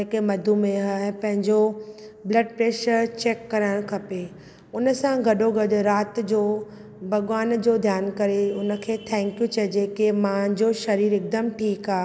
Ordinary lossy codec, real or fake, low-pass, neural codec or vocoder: none; real; none; none